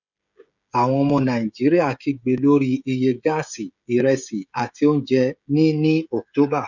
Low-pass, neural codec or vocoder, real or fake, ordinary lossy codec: 7.2 kHz; codec, 16 kHz, 16 kbps, FreqCodec, smaller model; fake; none